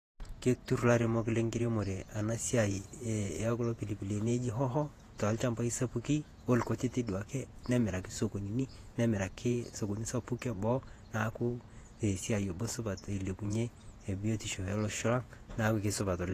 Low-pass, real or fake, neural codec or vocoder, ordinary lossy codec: 14.4 kHz; fake; vocoder, 48 kHz, 128 mel bands, Vocos; AAC, 48 kbps